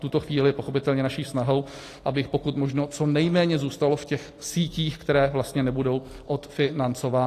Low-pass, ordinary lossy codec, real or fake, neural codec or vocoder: 14.4 kHz; AAC, 48 kbps; real; none